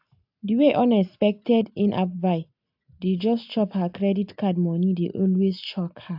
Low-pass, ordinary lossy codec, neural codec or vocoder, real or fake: 5.4 kHz; AAC, 48 kbps; none; real